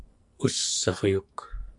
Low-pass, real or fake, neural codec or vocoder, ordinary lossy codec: 10.8 kHz; fake; codec, 44.1 kHz, 2.6 kbps, SNAC; MP3, 96 kbps